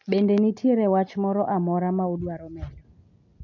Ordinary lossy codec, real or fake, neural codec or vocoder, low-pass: none; real; none; 7.2 kHz